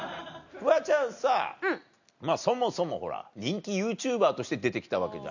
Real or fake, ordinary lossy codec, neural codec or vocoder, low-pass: real; none; none; 7.2 kHz